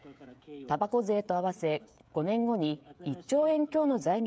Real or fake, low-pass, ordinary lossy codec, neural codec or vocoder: fake; none; none; codec, 16 kHz, 16 kbps, FreqCodec, smaller model